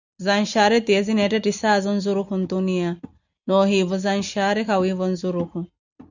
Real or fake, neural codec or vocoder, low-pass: real; none; 7.2 kHz